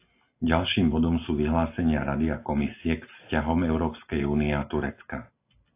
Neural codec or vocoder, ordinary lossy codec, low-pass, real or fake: none; AAC, 24 kbps; 3.6 kHz; real